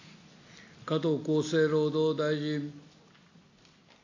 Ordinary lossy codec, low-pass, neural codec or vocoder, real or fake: AAC, 48 kbps; 7.2 kHz; none; real